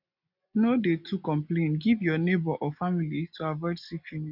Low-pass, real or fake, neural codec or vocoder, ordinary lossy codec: 5.4 kHz; real; none; none